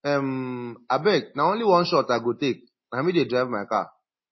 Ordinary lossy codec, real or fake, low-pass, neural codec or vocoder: MP3, 24 kbps; real; 7.2 kHz; none